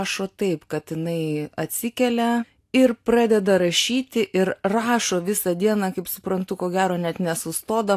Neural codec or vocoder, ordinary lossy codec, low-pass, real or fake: none; AAC, 64 kbps; 14.4 kHz; real